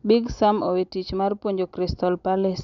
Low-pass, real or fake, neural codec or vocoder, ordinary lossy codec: 7.2 kHz; real; none; none